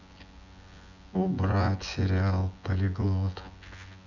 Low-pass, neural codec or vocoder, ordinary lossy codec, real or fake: 7.2 kHz; vocoder, 24 kHz, 100 mel bands, Vocos; none; fake